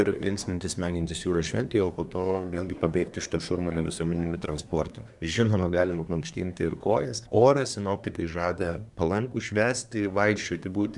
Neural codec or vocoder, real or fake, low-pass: codec, 24 kHz, 1 kbps, SNAC; fake; 10.8 kHz